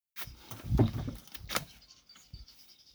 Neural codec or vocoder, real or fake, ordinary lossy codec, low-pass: codec, 44.1 kHz, 7.8 kbps, Pupu-Codec; fake; none; none